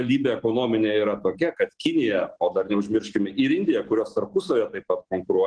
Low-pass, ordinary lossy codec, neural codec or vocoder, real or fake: 9.9 kHz; Opus, 16 kbps; none; real